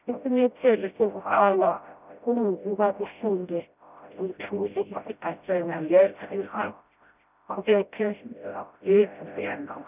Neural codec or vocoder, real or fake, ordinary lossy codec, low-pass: codec, 16 kHz, 0.5 kbps, FreqCodec, smaller model; fake; none; 3.6 kHz